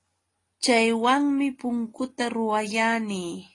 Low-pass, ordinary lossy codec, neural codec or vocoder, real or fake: 10.8 kHz; AAC, 32 kbps; none; real